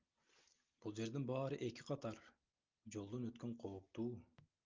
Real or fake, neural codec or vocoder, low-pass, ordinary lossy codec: real; none; 7.2 kHz; Opus, 24 kbps